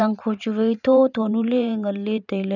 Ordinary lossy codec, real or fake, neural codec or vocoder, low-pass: none; fake; vocoder, 44.1 kHz, 128 mel bands every 512 samples, BigVGAN v2; 7.2 kHz